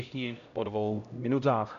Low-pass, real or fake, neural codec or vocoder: 7.2 kHz; fake; codec, 16 kHz, 0.5 kbps, X-Codec, HuBERT features, trained on LibriSpeech